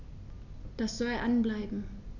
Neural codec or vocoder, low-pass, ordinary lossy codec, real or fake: none; 7.2 kHz; none; real